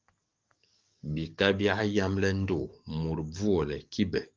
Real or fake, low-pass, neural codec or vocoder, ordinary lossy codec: fake; 7.2 kHz; codec, 44.1 kHz, 7.8 kbps, DAC; Opus, 32 kbps